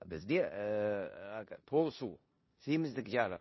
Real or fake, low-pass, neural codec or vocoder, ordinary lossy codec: fake; 7.2 kHz; codec, 16 kHz in and 24 kHz out, 0.9 kbps, LongCat-Audio-Codec, four codebook decoder; MP3, 24 kbps